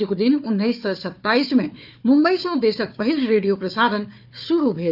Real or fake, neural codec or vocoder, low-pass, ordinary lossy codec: fake; codec, 16 kHz, 4 kbps, FunCodec, trained on Chinese and English, 50 frames a second; 5.4 kHz; none